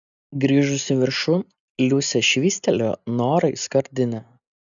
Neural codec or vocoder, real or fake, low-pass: none; real; 7.2 kHz